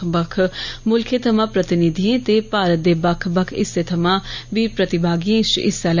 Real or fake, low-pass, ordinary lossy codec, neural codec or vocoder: real; 7.2 kHz; none; none